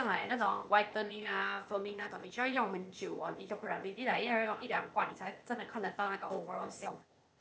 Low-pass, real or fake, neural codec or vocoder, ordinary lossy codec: none; fake; codec, 16 kHz, 0.8 kbps, ZipCodec; none